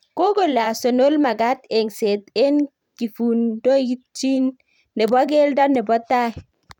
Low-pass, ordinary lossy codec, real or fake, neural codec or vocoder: 19.8 kHz; none; fake; vocoder, 44.1 kHz, 128 mel bands every 512 samples, BigVGAN v2